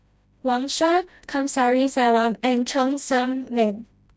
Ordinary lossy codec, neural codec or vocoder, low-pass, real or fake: none; codec, 16 kHz, 1 kbps, FreqCodec, smaller model; none; fake